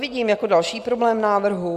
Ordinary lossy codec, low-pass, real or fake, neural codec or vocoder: Opus, 64 kbps; 14.4 kHz; real; none